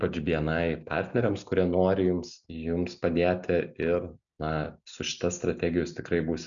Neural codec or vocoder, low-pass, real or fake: none; 7.2 kHz; real